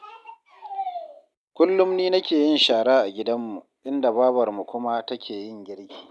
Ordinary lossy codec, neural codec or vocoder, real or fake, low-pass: none; none; real; none